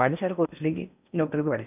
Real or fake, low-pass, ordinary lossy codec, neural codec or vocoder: fake; 3.6 kHz; none; codec, 16 kHz in and 24 kHz out, 0.8 kbps, FocalCodec, streaming, 65536 codes